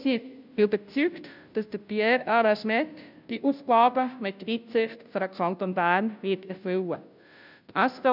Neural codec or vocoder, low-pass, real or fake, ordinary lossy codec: codec, 16 kHz, 0.5 kbps, FunCodec, trained on Chinese and English, 25 frames a second; 5.4 kHz; fake; none